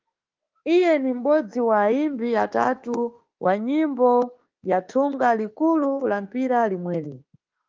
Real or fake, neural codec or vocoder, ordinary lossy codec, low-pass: fake; autoencoder, 48 kHz, 32 numbers a frame, DAC-VAE, trained on Japanese speech; Opus, 32 kbps; 7.2 kHz